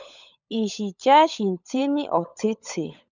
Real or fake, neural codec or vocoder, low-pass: fake; codec, 16 kHz, 16 kbps, FunCodec, trained on LibriTTS, 50 frames a second; 7.2 kHz